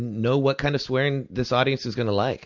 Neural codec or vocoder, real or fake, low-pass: none; real; 7.2 kHz